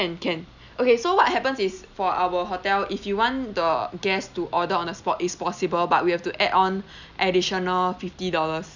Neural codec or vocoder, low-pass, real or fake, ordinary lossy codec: none; 7.2 kHz; real; none